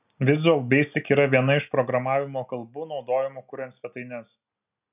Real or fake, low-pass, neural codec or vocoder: real; 3.6 kHz; none